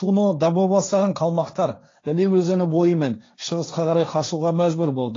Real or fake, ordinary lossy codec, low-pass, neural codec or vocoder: fake; AAC, 32 kbps; 7.2 kHz; codec, 16 kHz, 1.1 kbps, Voila-Tokenizer